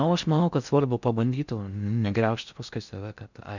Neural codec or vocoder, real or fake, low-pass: codec, 16 kHz in and 24 kHz out, 0.6 kbps, FocalCodec, streaming, 4096 codes; fake; 7.2 kHz